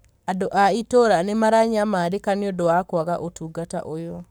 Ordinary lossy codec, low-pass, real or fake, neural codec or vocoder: none; none; fake; codec, 44.1 kHz, 7.8 kbps, Pupu-Codec